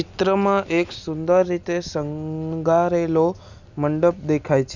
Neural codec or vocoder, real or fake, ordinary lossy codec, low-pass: none; real; none; 7.2 kHz